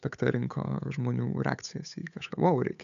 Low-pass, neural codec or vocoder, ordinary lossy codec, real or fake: 7.2 kHz; codec, 16 kHz, 8 kbps, FunCodec, trained on Chinese and English, 25 frames a second; MP3, 96 kbps; fake